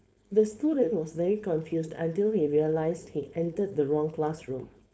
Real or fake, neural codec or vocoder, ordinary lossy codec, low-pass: fake; codec, 16 kHz, 4.8 kbps, FACodec; none; none